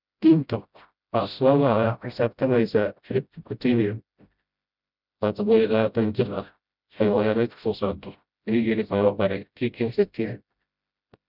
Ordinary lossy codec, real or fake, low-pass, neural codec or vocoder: none; fake; 5.4 kHz; codec, 16 kHz, 0.5 kbps, FreqCodec, smaller model